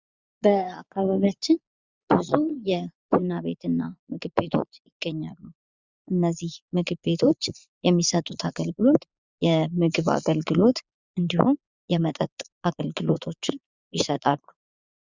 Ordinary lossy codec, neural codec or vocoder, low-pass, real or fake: Opus, 64 kbps; vocoder, 22.05 kHz, 80 mel bands, Vocos; 7.2 kHz; fake